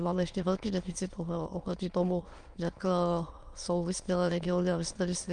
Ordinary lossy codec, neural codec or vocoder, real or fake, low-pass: Opus, 32 kbps; autoencoder, 22.05 kHz, a latent of 192 numbers a frame, VITS, trained on many speakers; fake; 9.9 kHz